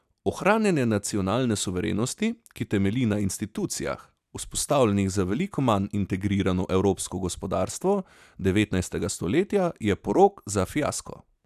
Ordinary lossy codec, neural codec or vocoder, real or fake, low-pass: none; none; real; 14.4 kHz